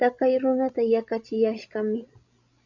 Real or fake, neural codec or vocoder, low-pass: fake; codec, 16 kHz, 16 kbps, FreqCodec, larger model; 7.2 kHz